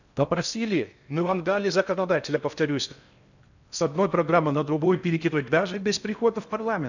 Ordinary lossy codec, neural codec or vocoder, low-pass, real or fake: none; codec, 16 kHz in and 24 kHz out, 0.6 kbps, FocalCodec, streaming, 4096 codes; 7.2 kHz; fake